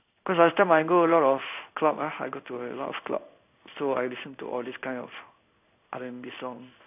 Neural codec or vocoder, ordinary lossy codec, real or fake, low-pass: codec, 16 kHz in and 24 kHz out, 1 kbps, XY-Tokenizer; none; fake; 3.6 kHz